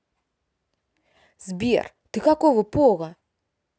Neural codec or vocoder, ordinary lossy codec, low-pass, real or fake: none; none; none; real